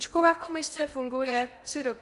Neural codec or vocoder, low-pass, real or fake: codec, 16 kHz in and 24 kHz out, 0.8 kbps, FocalCodec, streaming, 65536 codes; 10.8 kHz; fake